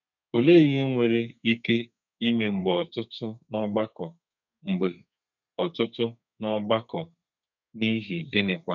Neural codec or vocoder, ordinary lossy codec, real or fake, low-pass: codec, 32 kHz, 1.9 kbps, SNAC; none; fake; 7.2 kHz